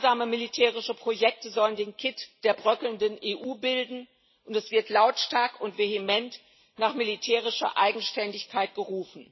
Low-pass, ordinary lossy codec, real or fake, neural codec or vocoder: 7.2 kHz; MP3, 24 kbps; real; none